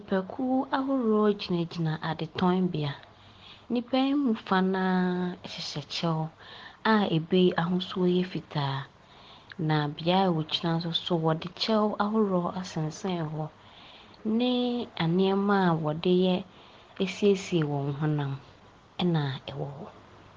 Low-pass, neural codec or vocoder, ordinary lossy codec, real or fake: 7.2 kHz; none; Opus, 16 kbps; real